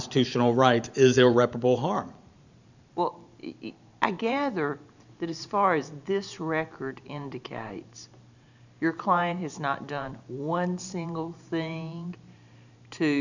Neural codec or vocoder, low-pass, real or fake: vocoder, 44.1 kHz, 128 mel bands every 512 samples, BigVGAN v2; 7.2 kHz; fake